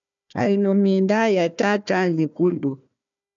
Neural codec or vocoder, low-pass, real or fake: codec, 16 kHz, 1 kbps, FunCodec, trained on Chinese and English, 50 frames a second; 7.2 kHz; fake